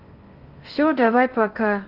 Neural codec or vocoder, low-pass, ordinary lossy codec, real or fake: codec, 16 kHz in and 24 kHz out, 0.8 kbps, FocalCodec, streaming, 65536 codes; 5.4 kHz; Opus, 24 kbps; fake